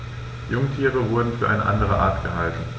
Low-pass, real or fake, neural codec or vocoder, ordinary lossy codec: none; real; none; none